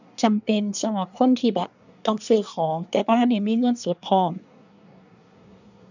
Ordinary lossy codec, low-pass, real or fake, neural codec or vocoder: none; 7.2 kHz; fake; codec, 24 kHz, 1 kbps, SNAC